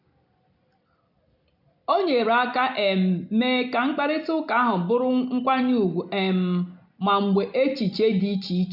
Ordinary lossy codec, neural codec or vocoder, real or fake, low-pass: none; none; real; 5.4 kHz